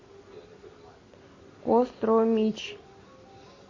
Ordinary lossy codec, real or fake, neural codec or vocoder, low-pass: MP3, 32 kbps; real; none; 7.2 kHz